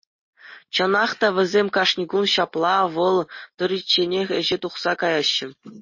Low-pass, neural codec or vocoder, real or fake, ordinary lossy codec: 7.2 kHz; none; real; MP3, 32 kbps